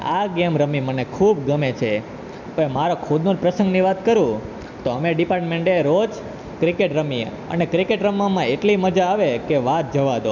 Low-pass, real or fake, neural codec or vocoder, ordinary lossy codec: 7.2 kHz; real; none; none